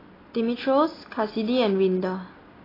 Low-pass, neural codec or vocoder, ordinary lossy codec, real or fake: 5.4 kHz; none; AAC, 24 kbps; real